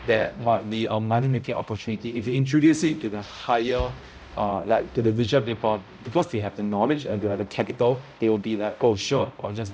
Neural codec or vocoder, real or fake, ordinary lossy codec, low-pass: codec, 16 kHz, 0.5 kbps, X-Codec, HuBERT features, trained on balanced general audio; fake; none; none